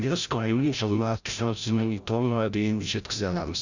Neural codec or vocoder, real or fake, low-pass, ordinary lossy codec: codec, 16 kHz, 0.5 kbps, FreqCodec, larger model; fake; 7.2 kHz; none